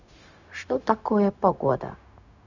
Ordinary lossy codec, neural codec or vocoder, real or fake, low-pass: none; codec, 16 kHz, 0.4 kbps, LongCat-Audio-Codec; fake; 7.2 kHz